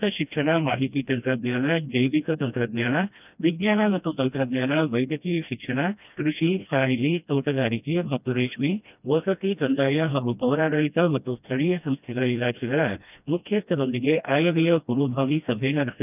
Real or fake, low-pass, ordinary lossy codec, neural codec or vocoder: fake; 3.6 kHz; none; codec, 16 kHz, 1 kbps, FreqCodec, smaller model